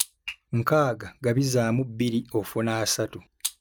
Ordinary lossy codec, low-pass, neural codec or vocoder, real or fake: none; none; none; real